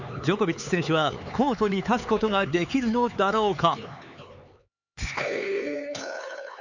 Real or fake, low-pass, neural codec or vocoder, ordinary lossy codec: fake; 7.2 kHz; codec, 16 kHz, 4 kbps, X-Codec, HuBERT features, trained on LibriSpeech; none